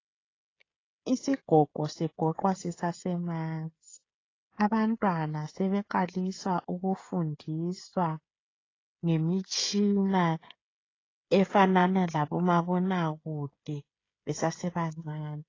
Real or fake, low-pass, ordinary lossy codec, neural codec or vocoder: fake; 7.2 kHz; AAC, 32 kbps; codec, 16 kHz, 16 kbps, FreqCodec, smaller model